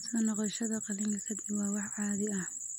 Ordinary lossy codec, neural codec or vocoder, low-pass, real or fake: none; none; 19.8 kHz; real